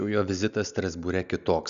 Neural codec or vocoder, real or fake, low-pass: none; real; 7.2 kHz